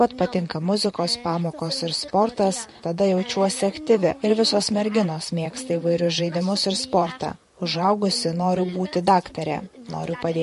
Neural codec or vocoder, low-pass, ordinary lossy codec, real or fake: vocoder, 44.1 kHz, 128 mel bands, Pupu-Vocoder; 14.4 kHz; MP3, 48 kbps; fake